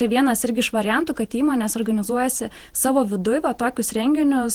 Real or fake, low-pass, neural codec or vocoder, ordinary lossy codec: fake; 19.8 kHz; vocoder, 48 kHz, 128 mel bands, Vocos; Opus, 16 kbps